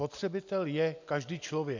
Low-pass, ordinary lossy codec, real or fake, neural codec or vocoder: 7.2 kHz; AAC, 48 kbps; real; none